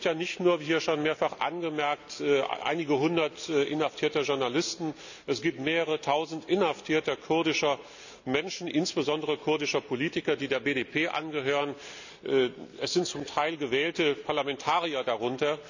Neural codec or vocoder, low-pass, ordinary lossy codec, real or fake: none; 7.2 kHz; none; real